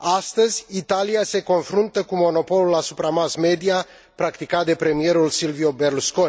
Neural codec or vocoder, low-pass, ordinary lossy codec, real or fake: none; none; none; real